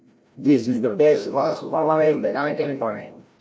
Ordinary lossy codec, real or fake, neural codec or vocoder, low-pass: none; fake; codec, 16 kHz, 0.5 kbps, FreqCodec, larger model; none